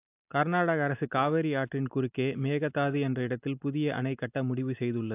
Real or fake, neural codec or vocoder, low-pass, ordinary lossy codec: real; none; 3.6 kHz; AAC, 32 kbps